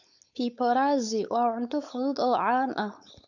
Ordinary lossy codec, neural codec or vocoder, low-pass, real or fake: none; codec, 16 kHz, 4.8 kbps, FACodec; 7.2 kHz; fake